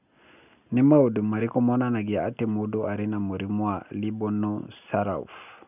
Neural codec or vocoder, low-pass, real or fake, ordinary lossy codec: none; 3.6 kHz; real; none